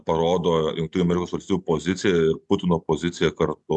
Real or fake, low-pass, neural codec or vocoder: real; 10.8 kHz; none